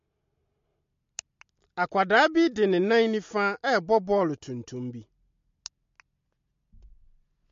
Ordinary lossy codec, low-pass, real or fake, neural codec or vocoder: MP3, 48 kbps; 7.2 kHz; real; none